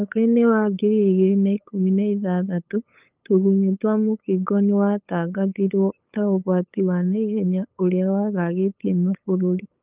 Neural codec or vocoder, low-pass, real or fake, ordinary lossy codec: codec, 16 kHz, 8 kbps, FunCodec, trained on LibriTTS, 25 frames a second; 3.6 kHz; fake; Opus, 32 kbps